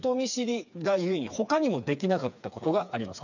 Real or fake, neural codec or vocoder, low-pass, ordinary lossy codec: fake; codec, 16 kHz, 4 kbps, FreqCodec, smaller model; 7.2 kHz; none